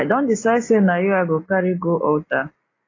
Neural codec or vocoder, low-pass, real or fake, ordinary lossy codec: none; 7.2 kHz; real; AAC, 32 kbps